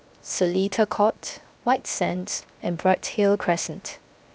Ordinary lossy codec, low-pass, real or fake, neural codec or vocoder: none; none; fake; codec, 16 kHz, 0.7 kbps, FocalCodec